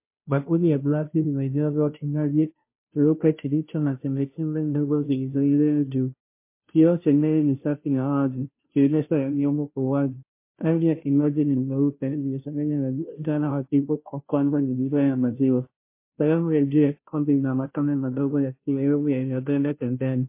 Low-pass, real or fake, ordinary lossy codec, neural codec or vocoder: 3.6 kHz; fake; MP3, 24 kbps; codec, 16 kHz, 0.5 kbps, FunCodec, trained on Chinese and English, 25 frames a second